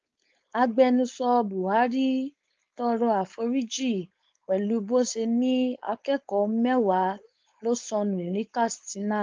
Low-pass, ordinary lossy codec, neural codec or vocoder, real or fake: 7.2 kHz; Opus, 24 kbps; codec, 16 kHz, 4.8 kbps, FACodec; fake